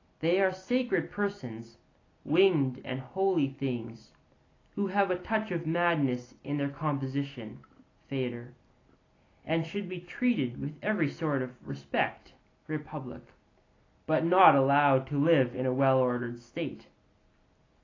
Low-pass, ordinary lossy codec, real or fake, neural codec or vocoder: 7.2 kHz; AAC, 32 kbps; real; none